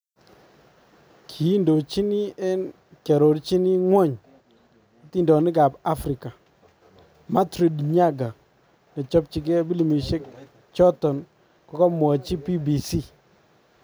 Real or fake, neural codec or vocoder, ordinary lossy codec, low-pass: real; none; none; none